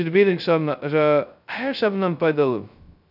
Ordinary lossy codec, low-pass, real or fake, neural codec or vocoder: none; 5.4 kHz; fake; codec, 16 kHz, 0.2 kbps, FocalCodec